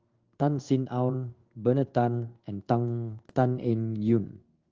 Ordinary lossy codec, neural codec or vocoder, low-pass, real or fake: Opus, 24 kbps; codec, 16 kHz in and 24 kHz out, 1 kbps, XY-Tokenizer; 7.2 kHz; fake